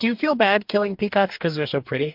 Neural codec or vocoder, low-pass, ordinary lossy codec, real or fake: codec, 44.1 kHz, 2.6 kbps, DAC; 5.4 kHz; MP3, 48 kbps; fake